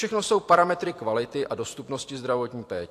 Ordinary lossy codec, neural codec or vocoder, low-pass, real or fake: AAC, 64 kbps; vocoder, 44.1 kHz, 128 mel bands every 256 samples, BigVGAN v2; 14.4 kHz; fake